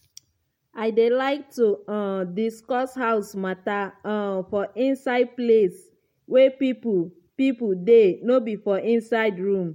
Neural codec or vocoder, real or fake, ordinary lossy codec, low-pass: none; real; MP3, 64 kbps; 19.8 kHz